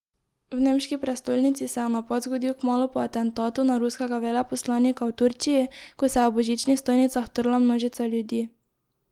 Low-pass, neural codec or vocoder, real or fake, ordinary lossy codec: 19.8 kHz; none; real; Opus, 24 kbps